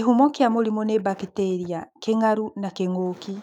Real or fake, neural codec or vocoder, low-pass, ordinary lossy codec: fake; autoencoder, 48 kHz, 128 numbers a frame, DAC-VAE, trained on Japanese speech; 19.8 kHz; none